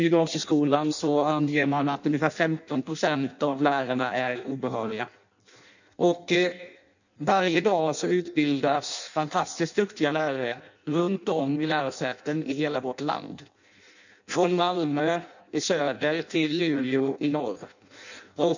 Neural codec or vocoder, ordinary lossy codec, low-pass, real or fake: codec, 16 kHz in and 24 kHz out, 0.6 kbps, FireRedTTS-2 codec; none; 7.2 kHz; fake